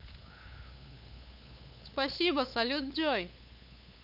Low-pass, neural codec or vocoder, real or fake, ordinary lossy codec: 5.4 kHz; codec, 16 kHz, 8 kbps, FunCodec, trained on LibriTTS, 25 frames a second; fake; none